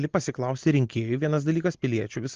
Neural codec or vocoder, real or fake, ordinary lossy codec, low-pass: none; real; Opus, 16 kbps; 7.2 kHz